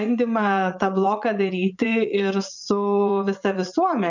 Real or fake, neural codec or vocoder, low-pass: fake; vocoder, 44.1 kHz, 80 mel bands, Vocos; 7.2 kHz